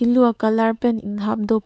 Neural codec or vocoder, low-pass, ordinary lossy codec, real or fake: codec, 16 kHz, 4 kbps, X-Codec, WavLM features, trained on Multilingual LibriSpeech; none; none; fake